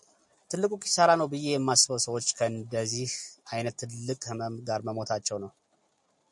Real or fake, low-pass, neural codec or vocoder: real; 10.8 kHz; none